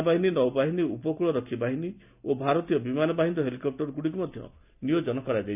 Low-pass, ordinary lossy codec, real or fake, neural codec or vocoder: 3.6 kHz; none; real; none